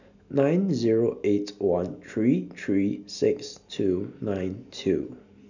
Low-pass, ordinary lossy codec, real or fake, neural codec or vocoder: 7.2 kHz; none; real; none